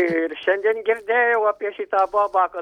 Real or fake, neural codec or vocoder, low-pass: real; none; 19.8 kHz